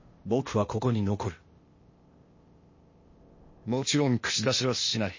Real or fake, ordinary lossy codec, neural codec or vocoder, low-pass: fake; MP3, 32 kbps; codec, 16 kHz, 0.8 kbps, ZipCodec; 7.2 kHz